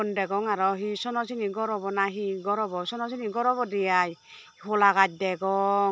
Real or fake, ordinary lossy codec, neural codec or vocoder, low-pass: real; none; none; none